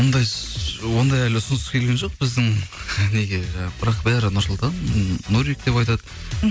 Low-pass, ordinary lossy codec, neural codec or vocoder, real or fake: none; none; none; real